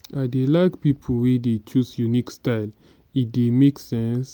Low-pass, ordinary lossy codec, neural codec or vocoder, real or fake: none; none; none; real